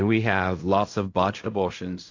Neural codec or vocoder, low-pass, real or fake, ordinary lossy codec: codec, 16 kHz in and 24 kHz out, 0.4 kbps, LongCat-Audio-Codec, fine tuned four codebook decoder; 7.2 kHz; fake; AAC, 48 kbps